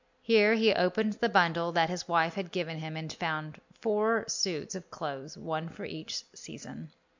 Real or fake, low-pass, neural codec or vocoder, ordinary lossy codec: real; 7.2 kHz; none; MP3, 64 kbps